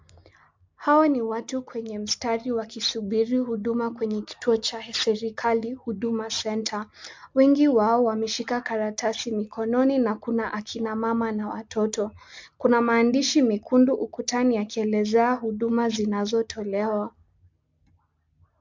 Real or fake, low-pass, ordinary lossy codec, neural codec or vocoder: real; 7.2 kHz; MP3, 64 kbps; none